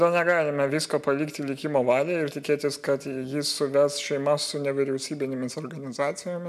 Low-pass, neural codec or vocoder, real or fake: 14.4 kHz; none; real